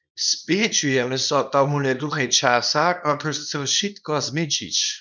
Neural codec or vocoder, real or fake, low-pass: codec, 24 kHz, 0.9 kbps, WavTokenizer, small release; fake; 7.2 kHz